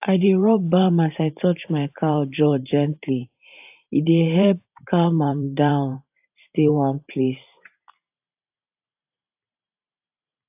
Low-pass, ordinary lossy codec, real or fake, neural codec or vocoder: 3.6 kHz; AAC, 32 kbps; fake; vocoder, 44.1 kHz, 128 mel bands every 512 samples, BigVGAN v2